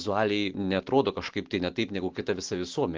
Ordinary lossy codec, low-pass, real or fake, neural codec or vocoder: Opus, 16 kbps; 7.2 kHz; real; none